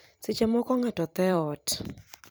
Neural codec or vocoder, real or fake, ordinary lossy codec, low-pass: vocoder, 44.1 kHz, 128 mel bands every 512 samples, BigVGAN v2; fake; none; none